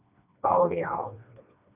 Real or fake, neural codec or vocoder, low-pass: fake; codec, 16 kHz, 2 kbps, FreqCodec, smaller model; 3.6 kHz